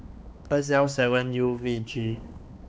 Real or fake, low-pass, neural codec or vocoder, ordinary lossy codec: fake; none; codec, 16 kHz, 2 kbps, X-Codec, HuBERT features, trained on balanced general audio; none